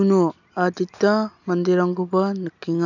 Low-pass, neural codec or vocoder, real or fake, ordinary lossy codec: 7.2 kHz; none; real; none